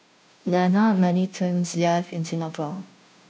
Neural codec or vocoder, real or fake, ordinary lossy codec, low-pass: codec, 16 kHz, 0.5 kbps, FunCodec, trained on Chinese and English, 25 frames a second; fake; none; none